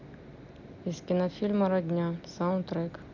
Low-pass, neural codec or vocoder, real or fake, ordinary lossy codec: 7.2 kHz; none; real; none